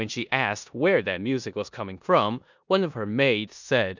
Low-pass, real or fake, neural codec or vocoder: 7.2 kHz; fake; codec, 16 kHz in and 24 kHz out, 0.9 kbps, LongCat-Audio-Codec, four codebook decoder